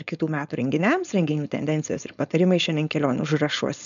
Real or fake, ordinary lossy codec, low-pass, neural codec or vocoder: fake; MP3, 96 kbps; 7.2 kHz; codec, 16 kHz, 4.8 kbps, FACodec